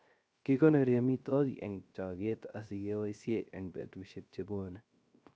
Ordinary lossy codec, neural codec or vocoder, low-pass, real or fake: none; codec, 16 kHz, 0.3 kbps, FocalCodec; none; fake